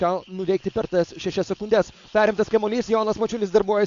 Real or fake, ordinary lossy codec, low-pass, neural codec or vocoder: fake; AAC, 64 kbps; 7.2 kHz; codec, 16 kHz, 4.8 kbps, FACodec